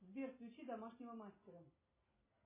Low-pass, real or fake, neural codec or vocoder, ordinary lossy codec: 3.6 kHz; real; none; MP3, 16 kbps